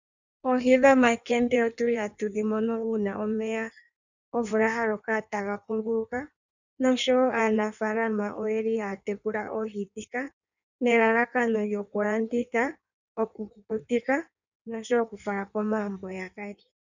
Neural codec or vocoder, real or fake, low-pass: codec, 16 kHz in and 24 kHz out, 1.1 kbps, FireRedTTS-2 codec; fake; 7.2 kHz